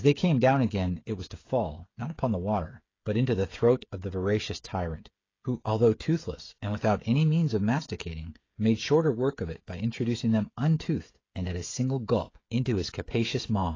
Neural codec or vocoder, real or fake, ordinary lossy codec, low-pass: codec, 16 kHz, 16 kbps, FreqCodec, smaller model; fake; AAC, 32 kbps; 7.2 kHz